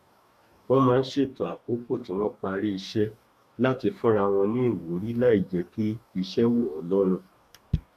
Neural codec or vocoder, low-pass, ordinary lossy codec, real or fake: codec, 44.1 kHz, 2.6 kbps, DAC; 14.4 kHz; none; fake